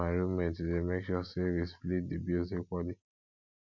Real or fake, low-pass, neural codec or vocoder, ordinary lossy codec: real; 7.2 kHz; none; none